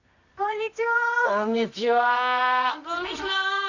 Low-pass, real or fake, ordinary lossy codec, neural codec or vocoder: 7.2 kHz; fake; none; codec, 24 kHz, 0.9 kbps, WavTokenizer, medium music audio release